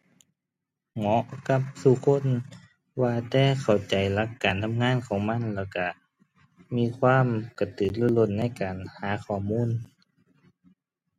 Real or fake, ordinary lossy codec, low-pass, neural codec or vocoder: real; MP3, 64 kbps; 19.8 kHz; none